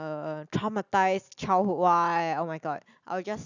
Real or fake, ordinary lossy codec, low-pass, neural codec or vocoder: real; none; 7.2 kHz; none